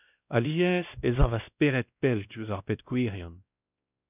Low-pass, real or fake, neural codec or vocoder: 3.6 kHz; fake; codec, 16 kHz, 1 kbps, X-Codec, WavLM features, trained on Multilingual LibriSpeech